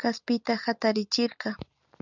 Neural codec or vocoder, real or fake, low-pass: none; real; 7.2 kHz